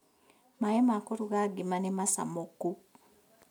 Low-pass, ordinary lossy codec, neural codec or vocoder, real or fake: 19.8 kHz; none; none; real